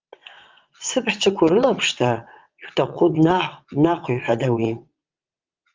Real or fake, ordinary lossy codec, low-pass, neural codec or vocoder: fake; Opus, 32 kbps; 7.2 kHz; vocoder, 22.05 kHz, 80 mel bands, WaveNeXt